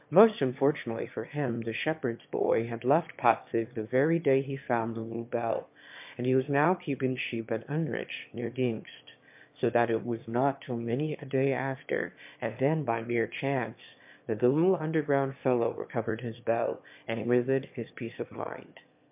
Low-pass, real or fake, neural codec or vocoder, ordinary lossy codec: 3.6 kHz; fake; autoencoder, 22.05 kHz, a latent of 192 numbers a frame, VITS, trained on one speaker; MP3, 32 kbps